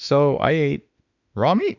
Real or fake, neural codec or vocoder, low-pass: fake; autoencoder, 48 kHz, 32 numbers a frame, DAC-VAE, trained on Japanese speech; 7.2 kHz